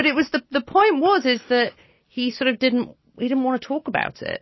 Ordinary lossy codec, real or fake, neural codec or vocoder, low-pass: MP3, 24 kbps; real; none; 7.2 kHz